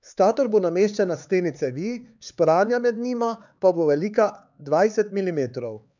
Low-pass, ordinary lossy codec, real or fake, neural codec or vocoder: 7.2 kHz; none; fake; codec, 16 kHz, 4 kbps, X-Codec, HuBERT features, trained on LibriSpeech